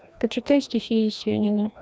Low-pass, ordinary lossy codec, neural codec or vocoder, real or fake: none; none; codec, 16 kHz, 1 kbps, FreqCodec, larger model; fake